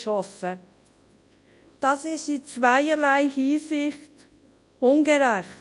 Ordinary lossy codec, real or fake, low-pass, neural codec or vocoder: AAC, 64 kbps; fake; 10.8 kHz; codec, 24 kHz, 0.9 kbps, WavTokenizer, large speech release